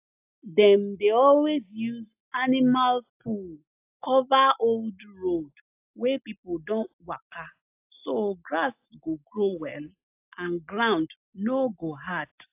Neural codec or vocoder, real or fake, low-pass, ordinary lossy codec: none; real; 3.6 kHz; AAC, 32 kbps